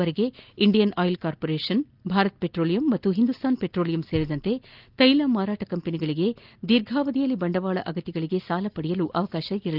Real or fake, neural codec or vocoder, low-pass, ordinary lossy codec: real; none; 5.4 kHz; Opus, 24 kbps